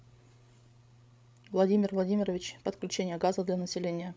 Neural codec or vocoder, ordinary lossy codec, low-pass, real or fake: codec, 16 kHz, 16 kbps, FreqCodec, larger model; none; none; fake